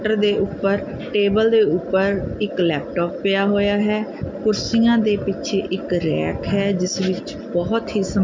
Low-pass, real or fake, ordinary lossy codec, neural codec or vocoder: 7.2 kHz; real; MP3, 64 kbps; none